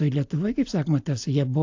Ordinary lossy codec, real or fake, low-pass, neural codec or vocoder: AAC, 48 kbps; real; 7.2 kHz; none